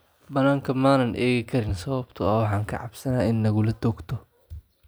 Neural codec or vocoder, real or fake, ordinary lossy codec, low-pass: none; real; none; none